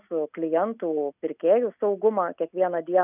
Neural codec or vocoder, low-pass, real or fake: none; 3.6 kHz; real